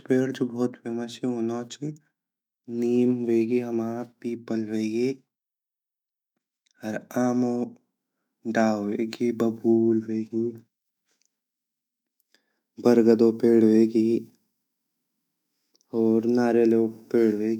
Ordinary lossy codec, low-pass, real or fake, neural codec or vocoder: none; 19.8 kHz; fake; autoencoder, 48 kHz, 128 numbers a frame, DAC-VAE, trained on Japanese speech